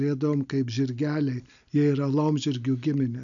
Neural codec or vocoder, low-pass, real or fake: none; 7.2 kHz; real